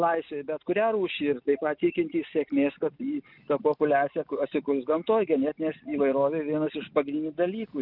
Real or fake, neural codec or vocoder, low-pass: real; none; 5.4 kHz